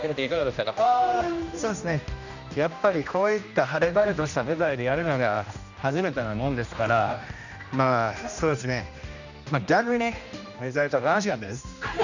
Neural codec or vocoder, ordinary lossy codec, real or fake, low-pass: codec, 16 kHz, 1 kbps, X-Codec, HuBERT features, trained on general audio; none; fake; 7.2 kHz